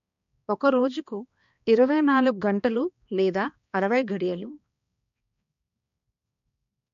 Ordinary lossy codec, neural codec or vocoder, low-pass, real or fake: MP3, 48 kbps; codec, 16 kHz, 2 kbps, X-Codec, HuBERT features, trained on balanced general audio; 7.2 kHz; fake